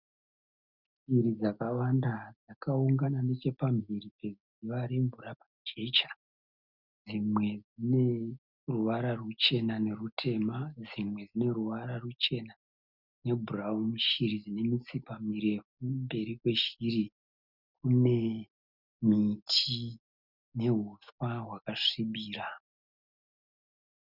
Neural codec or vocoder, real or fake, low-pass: none; real; 5.4 kHz